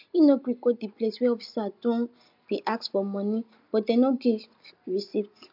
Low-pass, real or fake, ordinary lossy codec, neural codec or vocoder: 5.4 kHz; real; MP3, 48 kbps; none